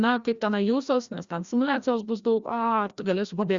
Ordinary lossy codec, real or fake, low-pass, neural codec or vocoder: Opus, 64 kbps; fake; 7.2 kHz; codec, 16 kHz, 1 kbps, FreqCodec, larger model